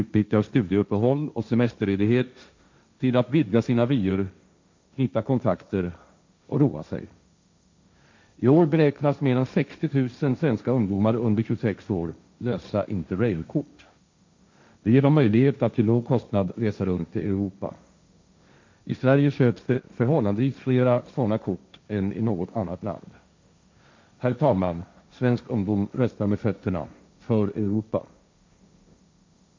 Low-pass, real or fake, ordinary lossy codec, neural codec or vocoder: 7.2 kHz; fake; MP3, 64 kbps; codec, 16 kHz, 1.1 kbps, Voila-Tokenizer